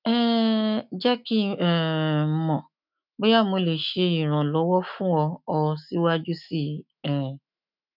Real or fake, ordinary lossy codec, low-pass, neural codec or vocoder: fake; none; 5.4 kHz; autoencoder, 48 kHz, 128 numbers a frame, DAC-VAE, trained on Japanese speech